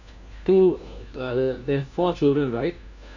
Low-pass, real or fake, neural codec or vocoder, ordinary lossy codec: 7.2 kHz; fake; codec, 16 kHz, 1 kbps, FunCodec, trained on LibriTTS, 50 frames a second; none